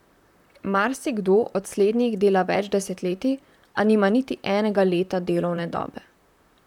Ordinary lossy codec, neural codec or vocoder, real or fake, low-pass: none; vocoder, 44.1 kHz, 128 mel bands, Pupu-Vocoder; fake; 19.8 kHz